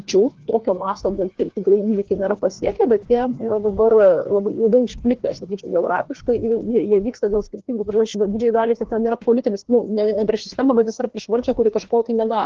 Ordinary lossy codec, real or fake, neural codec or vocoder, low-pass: Opus, 16 kbps; fake; codec, 16 kHz, 2 kbps, FreqCodec, larger model; 7.2 kHz